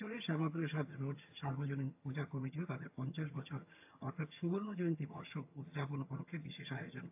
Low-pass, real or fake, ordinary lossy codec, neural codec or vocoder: 3.6 kHz; fake; AAC, 32 kbps; vocoder, 22.05 kHz, 80 mel bands, HiFi-GAN